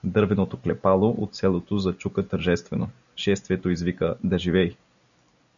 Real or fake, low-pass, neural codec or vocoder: real; 7.2 kHz; none